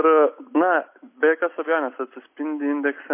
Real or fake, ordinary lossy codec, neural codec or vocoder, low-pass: real; MP3, 24 kbps; none; 3.6 kHz